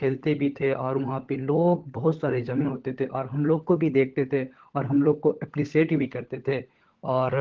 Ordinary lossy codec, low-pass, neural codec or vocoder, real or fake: Opus, 16 kbps; 7.2 kHz; codec, 16 kHz, 16 kbps, FunCodec, trained on LibriTTS, 50 frames a second; fake